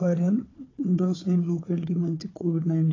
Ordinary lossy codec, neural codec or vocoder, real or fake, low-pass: AAC, 32 kbps; codec, 16 kHz, 4 kbps, FunCodec, trained on Chinese and English, 50 frames a second; fake; 7.2 kHz